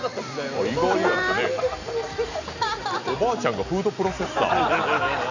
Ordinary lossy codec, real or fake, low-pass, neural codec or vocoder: none; fake; 7.2 kHz; vocoder, 44.1 kHz, 128 mel bands every 256 samples, BigVGAN v2